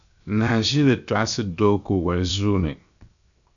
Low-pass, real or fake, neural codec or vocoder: 7.2 kHz; fake; codec, 16 kHz, 0.7 kbps, FocalCodec